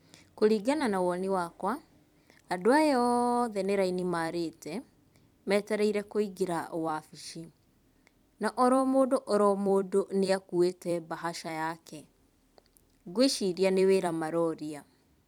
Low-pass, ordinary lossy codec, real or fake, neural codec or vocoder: 19.8 kHz; none; fake; vocoder, 44.1 kHz, 128 mel bands every 256 samples, BigVGAN v2